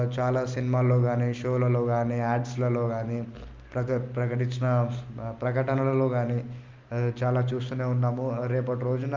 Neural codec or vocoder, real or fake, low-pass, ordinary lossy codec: none; real; 7.2 kHz; Opus, 32 kbps